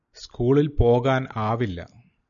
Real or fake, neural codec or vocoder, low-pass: real; none; 7.2 kHz